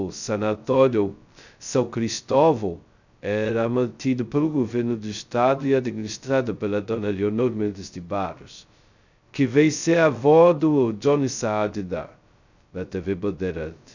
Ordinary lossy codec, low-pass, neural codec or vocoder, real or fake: none; 7.2 kHz; codec, 16 kHz, 0.2 kbps, FocalCodec; fake